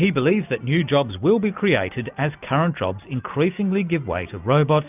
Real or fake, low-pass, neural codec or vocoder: real; 3.6 kHz; none